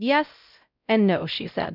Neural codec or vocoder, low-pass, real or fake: codec, 16 kHz, 0.5 kbps, X-Codec, WavLM features, trained on Multilingual LibriSpeech; 5.4 kHz; fake